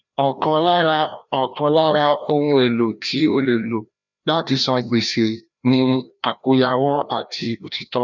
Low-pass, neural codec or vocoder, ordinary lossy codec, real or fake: 7.2 kHz; codec, 16 kHz, 1 kbps, FreqCodec, larger model; none; fake